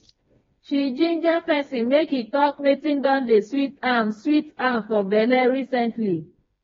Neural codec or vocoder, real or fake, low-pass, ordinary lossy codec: codec, 16 kHz, 2 kbps, FreqCodec, smaller model; fake; 7.2 kHz; AAC, 24 kbps